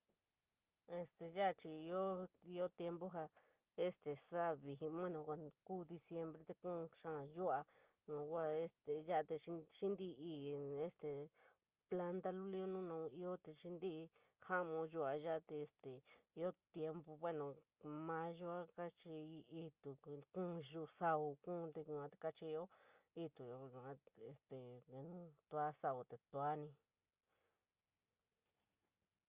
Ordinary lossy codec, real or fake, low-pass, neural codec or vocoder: Opus, 32 kbps; real; 3.6 kHz; none